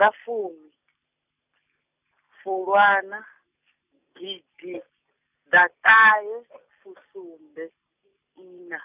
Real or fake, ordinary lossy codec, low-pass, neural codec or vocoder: real; none; 3.6 kHz; none